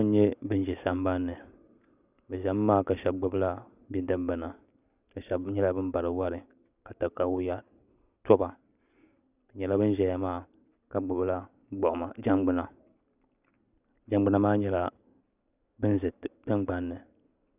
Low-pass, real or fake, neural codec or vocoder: 3.6 kHz; real; none